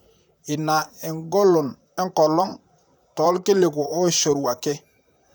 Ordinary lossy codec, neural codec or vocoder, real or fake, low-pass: none; vocoder, 44.1 kHz, 128 mel bands, Pupu-Vocoder; fake; none